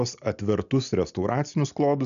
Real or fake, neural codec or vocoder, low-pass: real; none; 7.2 kHz